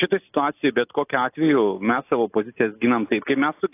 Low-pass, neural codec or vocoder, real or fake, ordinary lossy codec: 3.6 kHz; none; real; AAC, 24 kbps